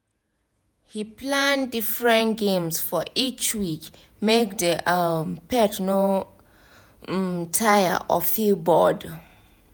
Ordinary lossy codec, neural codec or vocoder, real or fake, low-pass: none; vocoder, 48 kHz, 128 mel bands, Vocos; fake; none